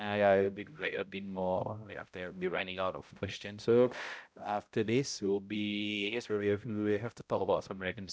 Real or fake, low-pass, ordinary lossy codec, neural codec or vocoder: fake; none; none; codec, 16 kHz, 0.5 kbps, X-Codec, HuBERT features, trained on balanced general audio